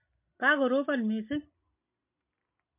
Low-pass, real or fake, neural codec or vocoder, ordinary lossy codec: 3.6 kHz; real; none; MP3, 24 kbps